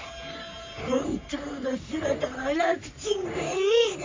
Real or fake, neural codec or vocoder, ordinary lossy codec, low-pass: fake; codec, 44.1 kHz, 3.4 kbps, Pupu-Codec; MP3, 64 kbps; 7.2 kHz